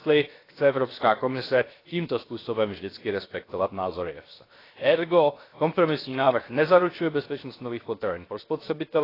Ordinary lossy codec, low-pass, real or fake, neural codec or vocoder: AAC, 24 kbps; 5.4 kHz; fake; codec, 16 kHz, 0.7 kbps, FocalCodec